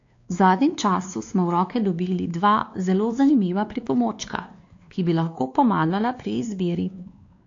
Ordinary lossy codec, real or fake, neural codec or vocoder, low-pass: AAC, 48 kbps; fake; codec, 16 kHz, 2 kbps, X-Codec, WavLM features, trained on Multilingual LibriSpeech; 7.2 kHz